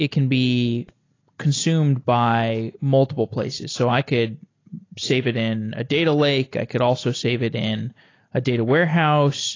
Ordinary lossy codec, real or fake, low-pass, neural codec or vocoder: AAC, 32 kbps; real; 7.2 kHz; none